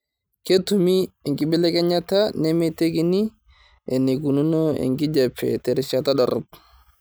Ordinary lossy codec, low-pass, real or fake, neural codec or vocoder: none; none; real; none